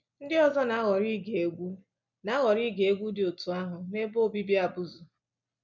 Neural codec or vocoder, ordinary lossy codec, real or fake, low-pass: none; none; real; 7.2 kHz